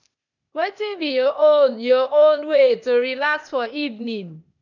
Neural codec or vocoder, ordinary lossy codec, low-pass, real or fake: codec, 16 kHz, 0.8 kbps, ZipCodec; none; 7.2 kHz; fake